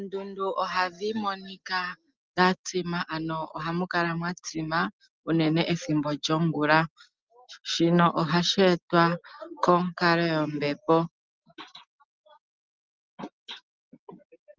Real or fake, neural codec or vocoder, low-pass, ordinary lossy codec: real; none; 7.2 kHz; Opus, 32 kbps